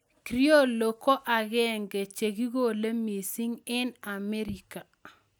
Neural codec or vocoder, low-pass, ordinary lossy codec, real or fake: none; none; none; real